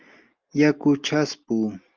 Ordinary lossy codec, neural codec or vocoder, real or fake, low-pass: Opus, 24 kbps; none; real; 7.2 kHz